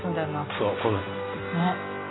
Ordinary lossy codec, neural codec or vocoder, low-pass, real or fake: AAC, 16 kbps; none; 7.2 kHz; real